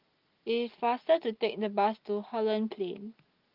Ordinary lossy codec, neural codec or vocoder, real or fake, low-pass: Opus, 24 kbps; none; real; 5.4 kHz